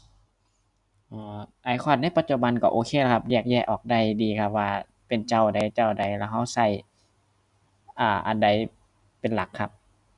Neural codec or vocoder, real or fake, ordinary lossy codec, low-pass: none; real; none; 10.8 kHz